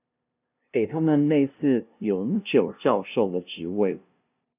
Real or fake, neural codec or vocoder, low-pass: fake; codec, 16 kHz, 0.5 kbps, FunCodec, trained on LibriTTS, 25 frames a second; 3.6 kHz